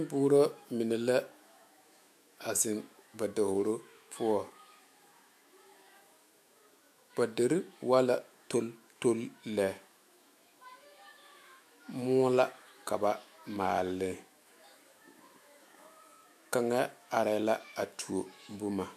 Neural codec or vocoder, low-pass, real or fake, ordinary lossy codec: autoencoder, 48 kHz, 128 numbers a frame, DAC-VAE, trained on Japanese speech; 14.4 kHz; fake; MP3, 96 kbps